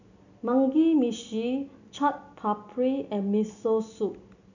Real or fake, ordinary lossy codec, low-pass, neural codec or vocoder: real; none; 7.2 kHz; none